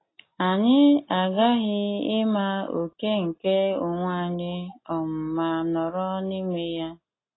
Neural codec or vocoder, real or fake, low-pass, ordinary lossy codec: none; real; 7.2 kHz; AAC, 16 kbps